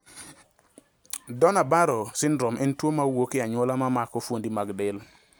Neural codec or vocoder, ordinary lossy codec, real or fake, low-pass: none; none; real; none